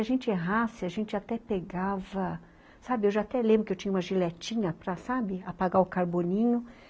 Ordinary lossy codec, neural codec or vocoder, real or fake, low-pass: none; none; real; none